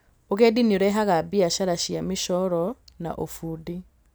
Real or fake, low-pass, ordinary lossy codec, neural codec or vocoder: real; none; none; none